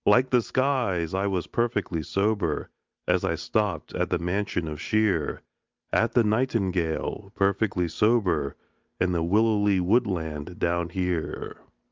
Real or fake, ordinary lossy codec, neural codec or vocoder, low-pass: real; Opus, 24 kbps; none; 7.2 kHz